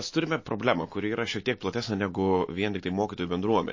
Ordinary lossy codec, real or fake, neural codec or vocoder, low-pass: MP3, 32 kbps; real; none; 7.2 kHz